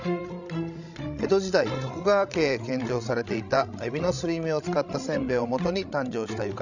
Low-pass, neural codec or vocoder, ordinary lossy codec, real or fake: 7.2 kHz; codec, 16 kHz, 16 kbps, FreqCodec, larger model; none; fake